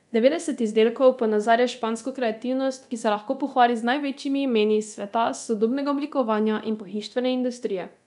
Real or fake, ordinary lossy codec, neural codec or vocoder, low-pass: fake; none; codec, 24 kHz, 0.9 kbps, DualCodec; 10.8 kHz